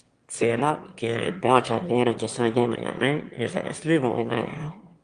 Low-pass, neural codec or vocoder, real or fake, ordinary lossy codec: 9.9 kHz; autoencoder, 22.05 kHz, a latent of 192 numbers a frame, VITS, trained on one speaker; fake; Opus, 32 kbps